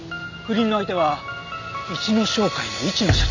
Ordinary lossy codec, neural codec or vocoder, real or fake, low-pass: none; none; real; 7.2 kHz